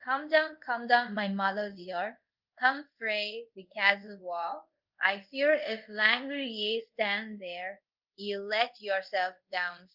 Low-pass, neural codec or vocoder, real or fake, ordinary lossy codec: 5.4 kHz; codec, 24 kHz, 0.5 kbps, DualCodec; fake; Opus, 24 kbps